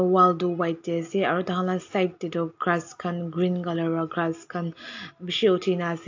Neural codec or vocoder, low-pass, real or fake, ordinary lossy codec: none; 7.2 kHz; real; AAC, 48 kbps